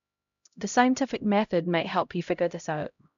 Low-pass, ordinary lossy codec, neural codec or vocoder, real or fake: 7.2 kHz; AAC, 96 kbps; codec, 16 kHz, 0.5 kbps, X-Codec, HuBERT features, trained on LibriSpeech; fake